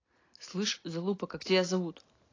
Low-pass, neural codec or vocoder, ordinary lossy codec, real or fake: 7.2 kHz; none; AAC, 32 kbps; real